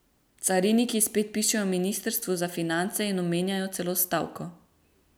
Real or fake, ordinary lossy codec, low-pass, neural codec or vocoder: real; none; none; none